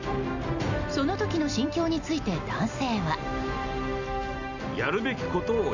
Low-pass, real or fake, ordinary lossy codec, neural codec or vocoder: 7.2 kHz; real; none; none